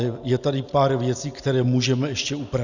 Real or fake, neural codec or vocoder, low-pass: real; none; 7.2 kHz